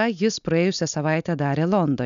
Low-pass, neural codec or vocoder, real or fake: 7.2 kHz; none; real